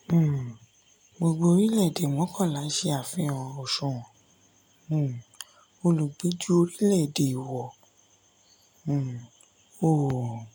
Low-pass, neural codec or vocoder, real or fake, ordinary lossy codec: none; none; real; none